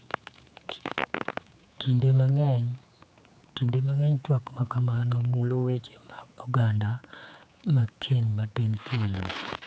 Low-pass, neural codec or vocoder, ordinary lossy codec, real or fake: none; codec, 16 kHz, 4 kbps, X-Codec, HuBERT features, trained on general audio; none; fake